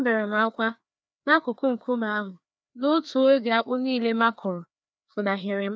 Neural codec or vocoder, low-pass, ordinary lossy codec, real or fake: codec, 16 kHz, 2 kbps, FreqCodec, larger model; none; none; fake